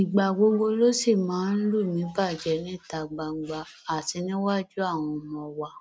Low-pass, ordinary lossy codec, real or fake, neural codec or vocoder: none; none; real; none